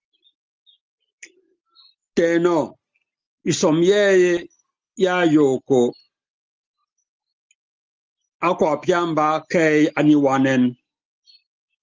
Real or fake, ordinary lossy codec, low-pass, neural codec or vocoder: real; Opus, 24 kbps; 7.2 kHz; none